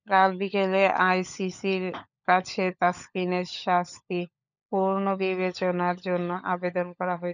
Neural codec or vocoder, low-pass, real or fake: codec, 16 kHz, 16 kbps, FunCodec, trained on LibriTTS, 50 frames a second; 7.2 kHz; fake